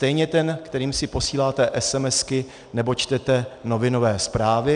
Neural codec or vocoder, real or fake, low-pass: none; real; 9.9 kHz